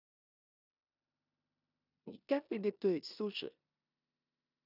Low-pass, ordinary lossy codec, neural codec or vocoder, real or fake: 5.4 kHz; none; codec, 16 kHz in and 24 kHz out, 0.9 kbps, LongCat-Audio-Codec, four codebook decoder; fake